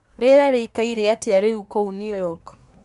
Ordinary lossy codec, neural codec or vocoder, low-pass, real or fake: none; codec, 24 kHz, 1 kbps, SNAC; 10.8 kHz; fake